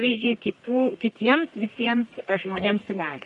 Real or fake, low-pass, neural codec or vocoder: fake; 10.8 kHz; codec, 44.1 kHz, 1.7 kbps, Pupu-Codec